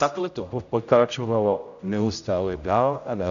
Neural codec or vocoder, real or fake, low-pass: codec, 16 kHz, 0.5 kbps, X-Codec, HuBERT features, trained on general audio; fake; 7.2 kHz